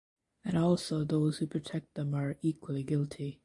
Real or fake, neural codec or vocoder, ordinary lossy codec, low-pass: real; none; AAC, 64 kbps; 10.8 kHz